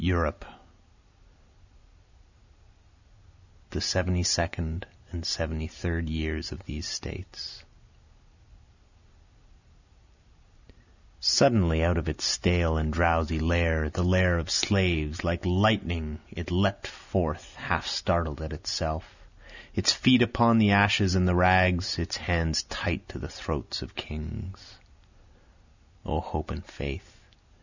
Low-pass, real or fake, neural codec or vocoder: 7.2 kHz; real; none